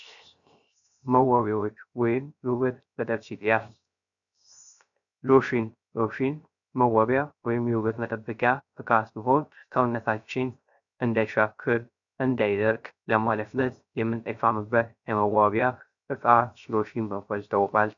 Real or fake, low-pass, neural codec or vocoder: fake; 7.2 kHz; codec, 16 kHz, 0.3 kbps, FocalCodec